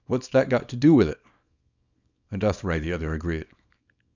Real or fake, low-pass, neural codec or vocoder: fake; 7.2 kHz; codec, 24 kHz, 0.9 kbps, WavTokenizer, small release